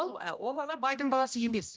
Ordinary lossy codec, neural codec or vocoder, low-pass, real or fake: none; codec, 16 kHz, 1 kbps, X-Codec, HuBERT features, trained on general audio; none; fake